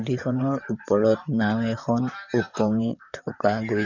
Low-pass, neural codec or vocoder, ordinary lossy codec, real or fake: 7.2 kHz; vocoder, 44.1 kHz, 128 mel bands, Pupu-Vocoder; none; fake